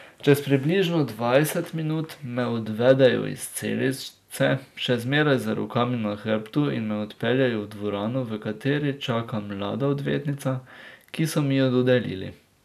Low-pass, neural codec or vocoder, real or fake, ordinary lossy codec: 14.4 kHz; none; real; none